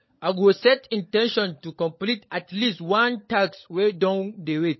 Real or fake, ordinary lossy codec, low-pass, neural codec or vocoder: fake; MP3, 24 kbps; 7.2 kHz; codec, 16 kHz, 16 kbps, FunCodec, trained on LibriTTS, 50 frames a second